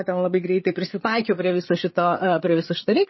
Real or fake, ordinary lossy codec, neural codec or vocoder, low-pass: fake; MP3, 24 kbps; codec, 16 kHz, 8 kbps, FreqCodec, larger model; 7.2 kHz